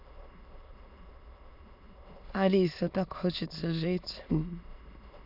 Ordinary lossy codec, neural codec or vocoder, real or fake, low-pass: none; autoencoder, 22.05 kHz, a latent of 192 numbers a frame, VITS, trained on many speakers; fake; 5.4 kHz